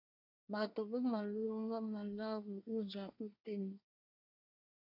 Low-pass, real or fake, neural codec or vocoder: 5.4 kHz; fake; codec, 24 kHz, 1 kbps, SNAC